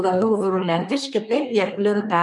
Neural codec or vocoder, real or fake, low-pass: codec, 24 kHz, 1 kbps, SNAC; fake; 10.8 kHz